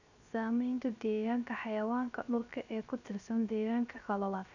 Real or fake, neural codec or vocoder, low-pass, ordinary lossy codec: fake; codec, 16 kHz, 0.3 kbps, FocalCodec; 7.2 kHz; none